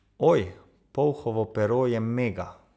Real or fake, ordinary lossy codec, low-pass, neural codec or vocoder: real; none; none; none